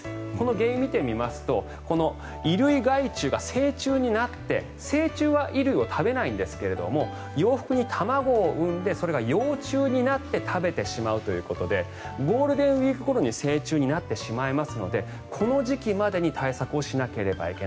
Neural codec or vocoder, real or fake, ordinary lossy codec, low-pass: none; real; none; none